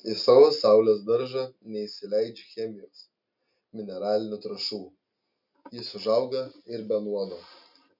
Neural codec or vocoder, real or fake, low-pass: none; real; 5.4 kHz